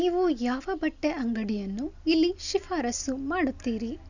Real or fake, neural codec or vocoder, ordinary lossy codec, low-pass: real; none; none; 7.2 kHz